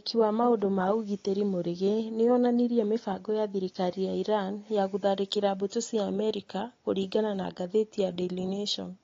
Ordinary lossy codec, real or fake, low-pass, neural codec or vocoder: AAC, 32 kbps; real; 7.2 kHz; none